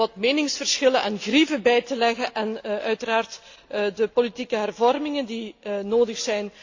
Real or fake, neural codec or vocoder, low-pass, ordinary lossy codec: real; none; 7.2 kHz; AAC, 48 kbps